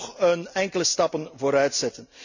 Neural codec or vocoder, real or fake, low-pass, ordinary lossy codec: none; real; 7.2 kHz; none